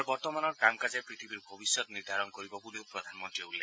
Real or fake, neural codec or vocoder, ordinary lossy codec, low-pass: real; none; none; none